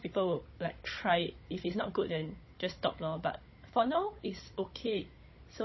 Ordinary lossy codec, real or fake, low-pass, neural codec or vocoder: MP3, 24 kbps; fake; 7.2 kHz; codec, 16 kHz, 16 kbps, FunCodec, trained on Chinese and English, 50 frames a second